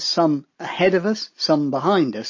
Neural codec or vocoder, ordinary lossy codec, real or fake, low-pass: none; MP3, 32 kbps; real; 7.2 kHz